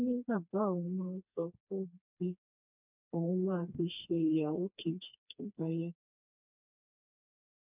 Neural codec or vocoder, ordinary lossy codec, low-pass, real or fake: codec, 16 kHz, 2 kbps, FreqCodec, smaller model; none; 3.6 kHz; fake